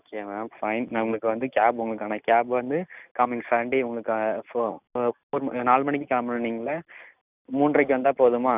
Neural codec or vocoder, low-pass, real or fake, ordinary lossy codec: none; 3.6 kHz; real; none